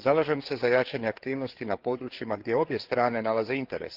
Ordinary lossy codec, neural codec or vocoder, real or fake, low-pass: Opus, 16 kbps; codec, 16 kHz, 4 kbps, FreqCodec, larger model; fake; 5.4 kHz